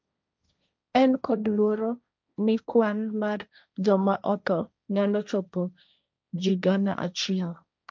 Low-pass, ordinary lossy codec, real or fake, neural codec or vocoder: none; none; fake; codec, 16 kHz, 1.1 kbps, Voila-Tokenizer